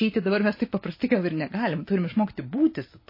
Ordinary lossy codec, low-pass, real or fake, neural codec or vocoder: MP3, 24 kbps; 5.4 kHz; real; none